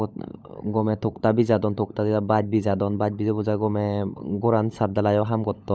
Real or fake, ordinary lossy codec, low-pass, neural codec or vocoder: fake; none; none; codec, 16 kHz, 16 kbps, FunCodec, trained on LibriTTS, 50 frames a second